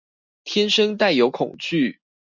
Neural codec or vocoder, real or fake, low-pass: none; real; 7.2 kHz